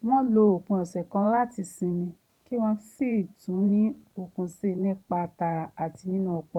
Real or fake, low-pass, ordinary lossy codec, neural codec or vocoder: fake; 19.8 kHz; none; vocoder, 44.1 kHz, 128 mel bands every 512 samples, BigVGAN v2